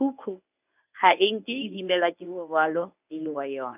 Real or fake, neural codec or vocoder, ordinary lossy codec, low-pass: fake; codec, 24 kHz, 0.9 kbps, WavTokenizer, medium speech release version 1; none; 3.6 kHz